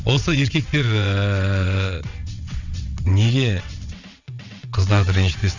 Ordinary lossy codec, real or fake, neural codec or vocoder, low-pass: none; fake; vocoder, 22.05 kHz, 80 mel bands, WaveNeXt; 7.2 kHz